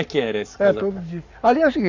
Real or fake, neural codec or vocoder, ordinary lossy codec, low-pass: fake; codec, 44.1 kHz, 7.8 kbps, Pupu-Codec; none; 7.2 kHz